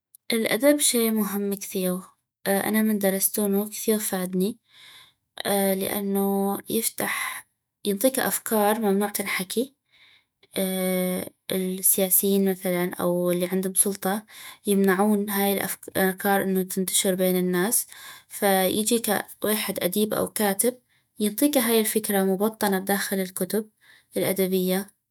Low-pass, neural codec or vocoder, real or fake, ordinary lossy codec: none; autoencoder, 48 kHz, 128 numbers a frame, DAC-VAE, trained on Japanese speech; fake; none